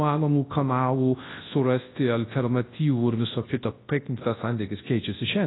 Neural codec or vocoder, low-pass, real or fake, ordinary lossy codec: codec, 24 kHz, 0.9 kbps, WavTokenizer, large speech release; 7.2 kHz; fake; AAC, 16 kbps